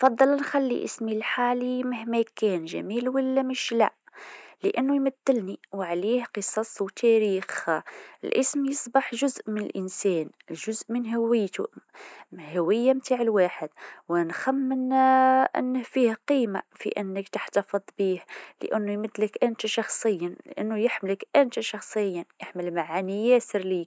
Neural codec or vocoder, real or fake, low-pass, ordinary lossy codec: none; real; none; none